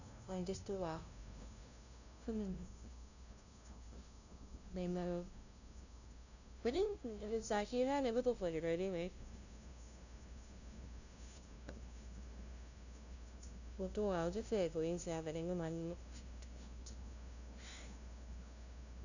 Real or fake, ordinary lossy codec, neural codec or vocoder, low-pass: fake; none; codec, 16 kHz, 0.5 kbps, FunCodec, trained on LibriTTS, 25 frames a second; 7.2 kHz